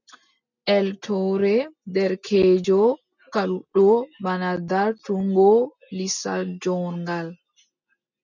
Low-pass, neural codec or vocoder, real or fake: 7.2 kHz; none; real